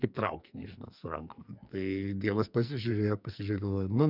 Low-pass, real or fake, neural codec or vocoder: 5.4 kHz; fake; codec, 44.1 kHz, 2.6 kbps, SNAC